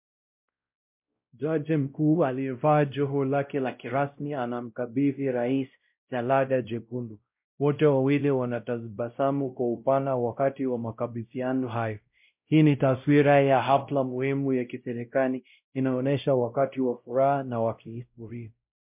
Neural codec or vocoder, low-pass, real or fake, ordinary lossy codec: codec, 16 kHz, 0.5 kbps, X-Codec, WavLM features, trained on Multilingual LibriSpeech; 3.6 kHz; fake; MP3, 32 kbps